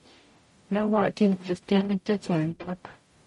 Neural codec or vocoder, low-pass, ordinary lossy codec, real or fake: codec, 44.1 kHz, 0.9 kbps, DAC; 19.8 kHz; MP3, 48 kbps; fake